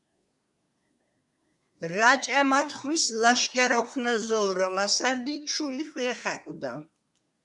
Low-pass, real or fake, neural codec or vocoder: 10.8 kHz; fake; codec, 24 kHz, 1 kbps, SNAC